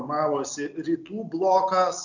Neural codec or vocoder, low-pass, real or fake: none; 7.2 kHz; real